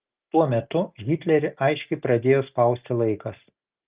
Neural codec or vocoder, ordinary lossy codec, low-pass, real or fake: none; Opus, 24 kbps; 3.6 kHz; real